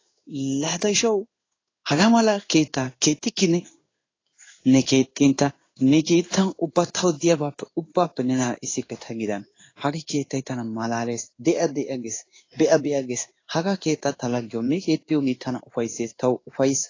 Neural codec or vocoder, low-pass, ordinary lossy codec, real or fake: codec, 16 kHz in and 24 kHz out, 1 kbps, XY-Tokenizer; 7.2 kHz; AAC, 32 kbps; fake